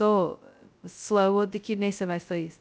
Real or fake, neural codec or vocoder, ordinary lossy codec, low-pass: fake; codec, 16 kHz, 0.2 kbps, FocalCodec; none; none